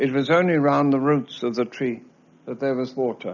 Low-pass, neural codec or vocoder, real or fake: 7.2 kHz; none; real